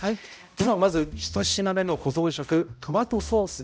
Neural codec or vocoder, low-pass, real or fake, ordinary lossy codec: codec, 16 kHz, 0.5 kbps, X-Codec, HuBERT features, trained on balanced general audio; none; fake; none